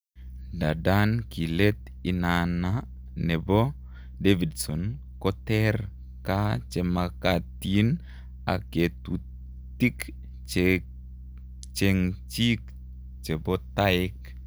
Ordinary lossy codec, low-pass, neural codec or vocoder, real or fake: none; none; none; real